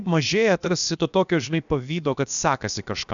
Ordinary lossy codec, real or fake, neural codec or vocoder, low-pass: MP3, 96 kbps; fake; codec, 16 kHz, about 1 kbps, DyCAST, with the encoder's durations; 7.2 kHz